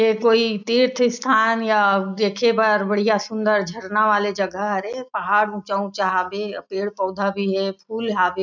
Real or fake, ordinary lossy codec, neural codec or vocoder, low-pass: real; none; none; 7.2 kHz